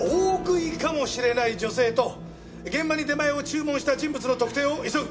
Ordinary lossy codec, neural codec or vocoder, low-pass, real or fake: none; none; none; real